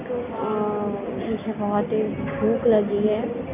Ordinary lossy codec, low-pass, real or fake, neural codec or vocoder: none; 3.6 kHz; real; none